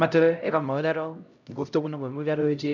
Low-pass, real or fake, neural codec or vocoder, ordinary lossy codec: 7.2 kHz; fake; codec, 16 kHz, 0.5 kbps, X-Codec, HuBERT features, trained on LibriSpeech; none